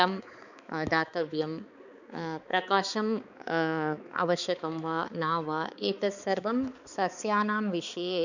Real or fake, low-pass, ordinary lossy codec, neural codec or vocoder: fake; 7.2 kHz; none; codec, 16 kHz, 4 kbps, X-Codec, HuBERT features, trained on balanced general audio